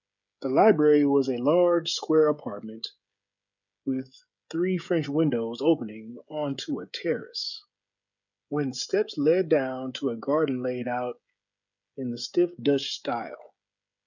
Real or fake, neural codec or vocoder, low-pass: fake; codec, 16 kHz, 16 kbps, FreqCodec, smaller model; 7.2 kHz